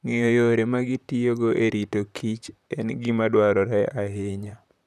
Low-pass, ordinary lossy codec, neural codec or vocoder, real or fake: 14.4 kHz; none; vocoder, 44.1 kHz, 128 mel bands, Pupu-Vocoder; fake